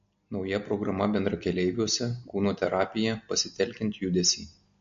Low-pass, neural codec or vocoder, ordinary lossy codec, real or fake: 7.2 kHz; none; MP3, 48 kbps; real